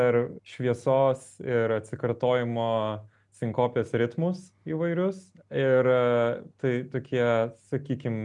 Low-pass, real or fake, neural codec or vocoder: 10.8 kHz; real; none